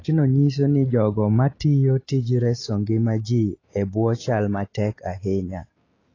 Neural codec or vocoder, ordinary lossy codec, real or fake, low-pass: none; AAC, 32 kbps; real; 7.2 kHz